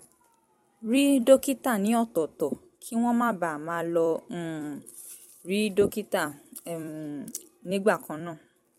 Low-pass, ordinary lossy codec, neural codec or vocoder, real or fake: 19.8 kHz; MP3, 64 kbps; vocoder, 44.1 kHz, 128 mel bands every 256 samples, BigVGAN v2; fake